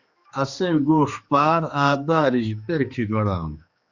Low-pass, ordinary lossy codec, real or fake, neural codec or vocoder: 7.2 kHz; Opus, 64 kbps; fake; codec, 16 kHz, 2 kbps, X-Codec, HuBERT features, trained on general audio